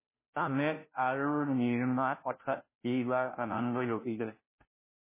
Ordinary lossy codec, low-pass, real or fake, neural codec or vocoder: MP3, 16 kbps; 3.6 kHz; fake; codec, 16 kHz, 0.5 kbps, FunCodec, trained on Chinese and English, 25 frames a second